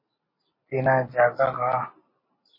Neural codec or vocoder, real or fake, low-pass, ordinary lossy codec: none; real; 5.4 kHz; MP3, 24 kbps